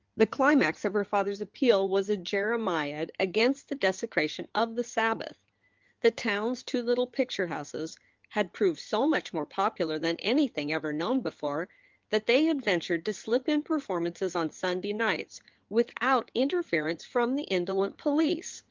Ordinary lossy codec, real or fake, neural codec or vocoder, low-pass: Opus, 24 kbps; fake; codec, 16 kHz in and 24 kHz out, 2.2 kbps, FireRedTTS-2 codec; 7.2 kHz